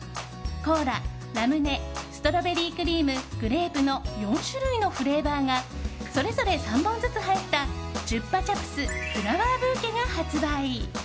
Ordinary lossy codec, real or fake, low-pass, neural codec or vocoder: none; real; none; none